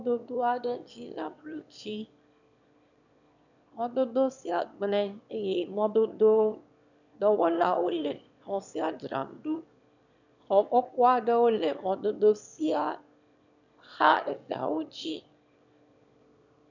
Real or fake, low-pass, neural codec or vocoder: fake; 7.2 kHz; autoencoder, 22.05 kHz, a latent of 192 numbers a frame, VITS, trained on one speaker